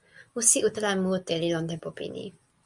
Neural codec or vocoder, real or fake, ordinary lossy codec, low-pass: none; real; Opus, 64 kbps; 10.8 kHz